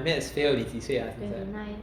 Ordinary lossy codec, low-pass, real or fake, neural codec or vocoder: Opus, 64 kbps; 19.8 kHz; real; none